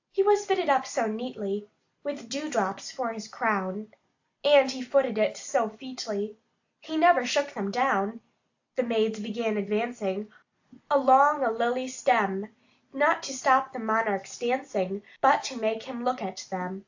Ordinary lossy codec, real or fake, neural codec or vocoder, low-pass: AAC, 48 kbps; real; none; 7.2 kHz